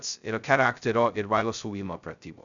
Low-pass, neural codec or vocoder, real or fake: 7.2 kHz; codec, 16 kHz, 0.2 kbps, FocalCodec; fake